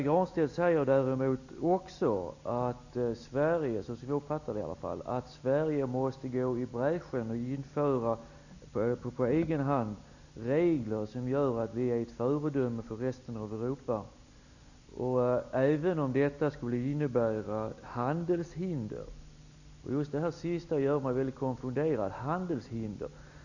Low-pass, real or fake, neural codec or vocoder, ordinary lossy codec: 7.2 kHz; real; none; none